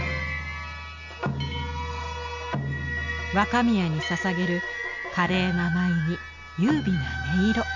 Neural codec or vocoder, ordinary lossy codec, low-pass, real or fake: none; none; 7.2 kHz; real